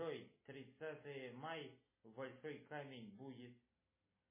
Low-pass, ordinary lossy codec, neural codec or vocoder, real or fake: 3.6 kHz; MP3, 16 kbps; none; real